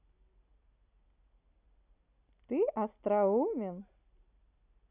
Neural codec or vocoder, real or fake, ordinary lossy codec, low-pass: none; real; none; 3.6 kHz